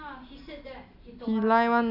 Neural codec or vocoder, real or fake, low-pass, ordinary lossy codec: none; real; 5.4 kHz; none